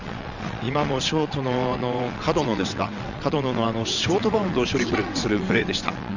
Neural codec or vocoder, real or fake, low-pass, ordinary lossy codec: vocoder, 22.05 kHz, 80 mel bands, WaveNeXt; fake; 7.2 kHz; none